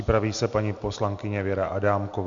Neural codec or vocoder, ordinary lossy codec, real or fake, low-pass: none; MP3, 48 kbps; real; 7.2 kHz